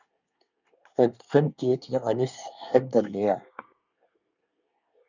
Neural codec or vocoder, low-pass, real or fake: codec, 24 kHz, 1 kbps, SNAC; 7.2 kHz; fake